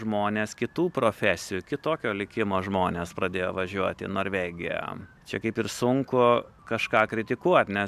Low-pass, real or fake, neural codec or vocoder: 14.4 kHz; real; none